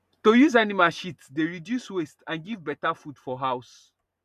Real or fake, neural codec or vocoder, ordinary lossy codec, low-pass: real; none; none; 14.4 kHz